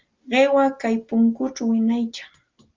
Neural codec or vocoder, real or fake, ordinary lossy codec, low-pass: none; real; Opus, 32 kbps; 7.2 kHz